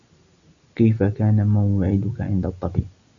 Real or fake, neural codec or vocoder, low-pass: real; none; 7.2 kHz